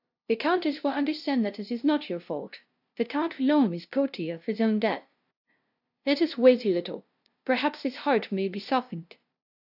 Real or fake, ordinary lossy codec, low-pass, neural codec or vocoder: fake; AAC, 48 kbps; 5.4 kHz; codec, 16 kHz, 0.5 kbps, FunCodec, trained on LibriTTS, 25 frames a second